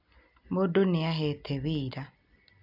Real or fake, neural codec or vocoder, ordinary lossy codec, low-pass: real; none; none; 5.4 kHz